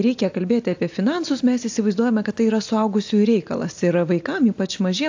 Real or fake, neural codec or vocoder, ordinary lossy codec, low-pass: real; none; AAC, 48 kbps; 7.2 kHz